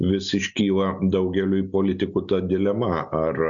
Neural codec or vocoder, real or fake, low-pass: none; real; 7.2 kHz